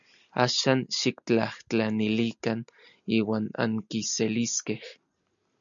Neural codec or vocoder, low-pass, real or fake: none; 7.2 kHz; real